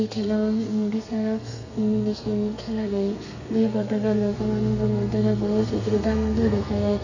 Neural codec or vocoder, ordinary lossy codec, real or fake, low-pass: codec, 32 kHz, 1.9 kbps, SNAC; none; fake; 7.2 kHz